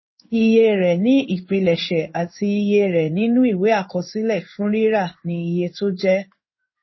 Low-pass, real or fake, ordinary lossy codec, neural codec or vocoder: 7.2 kHz; fake; MP3, 24 kbps; codec, 16 kHz in and 24 kHz out, 1 kbps, XY-Tokenizer